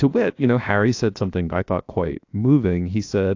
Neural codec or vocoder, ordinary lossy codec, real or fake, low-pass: codec, 16 kHz, 0.7 kbps, FocalCodec; AAC, 48 kbps; fake; 7.2 kHz